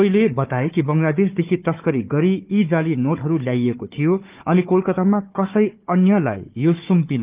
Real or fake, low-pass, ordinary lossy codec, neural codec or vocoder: fake; 3.6 kHz; Opus, 24 kbps; codec, 16 kHz, 4 kbps, FunCodec, trained on Chinese and English, 50 frames a second